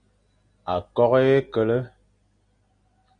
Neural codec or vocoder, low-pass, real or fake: none; 9.9 kHz; real